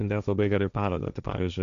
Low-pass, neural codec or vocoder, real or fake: 7.2 kHz; codec, 16 kHz, 1.1 kbps, Voila-Tokenizer; fake